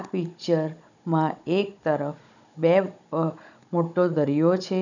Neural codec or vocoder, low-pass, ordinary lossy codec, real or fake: codec, 16 kHz, 16 kbps, FunCodec, trained on Chinese and English, 50 frames a second; 7.2 kHz; none; fake